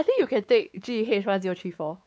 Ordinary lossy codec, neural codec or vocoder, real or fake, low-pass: none; codec, 16 kHz, 4 kbps, X-Codec, WavLM features, trained on Multilingual LibriSpeech; fake; none